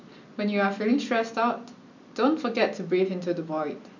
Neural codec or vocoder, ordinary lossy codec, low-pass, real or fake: none; none; 7.2 kHz; real